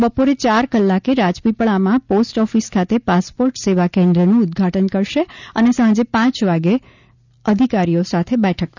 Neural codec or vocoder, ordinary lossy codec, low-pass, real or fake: none; none; 7.2 kHz; real